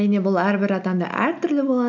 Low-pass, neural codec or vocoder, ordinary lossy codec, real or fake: 7.2 kHz; none; none; real